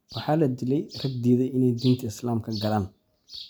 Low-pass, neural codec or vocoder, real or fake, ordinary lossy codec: none; none; real; none